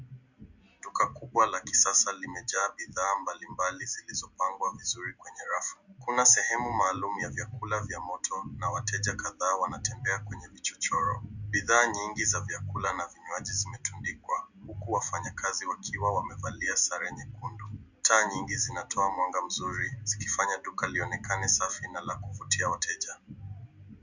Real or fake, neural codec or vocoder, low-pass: real; none; 7.2 kHz